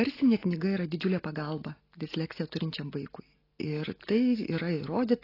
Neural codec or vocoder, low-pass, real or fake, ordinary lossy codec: none; 5.4 kHz; real; AAC, 24 kbps